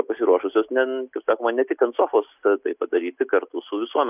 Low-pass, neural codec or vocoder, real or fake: 3.6 kHz; none; real